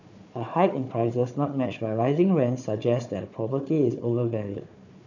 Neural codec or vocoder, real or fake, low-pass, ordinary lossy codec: codec, 16 kHz, 4 kbps, FunCodec, trained on Chinese and English, 50 frames a second; fake; 7.2 kHz; none